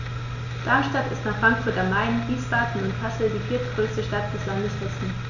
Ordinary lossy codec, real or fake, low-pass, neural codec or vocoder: none; real; 7.2 kHz; none